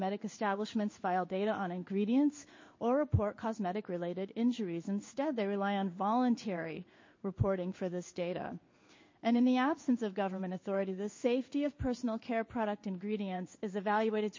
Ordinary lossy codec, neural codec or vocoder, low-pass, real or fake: MP3, 32 kbps; codec, 16 kHz in and 24 kHz out, 1 kbps, XY-Tokenizer; 7.2 kHz; fake